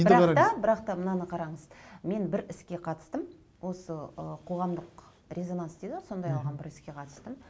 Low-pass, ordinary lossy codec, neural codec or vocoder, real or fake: none; none; none; real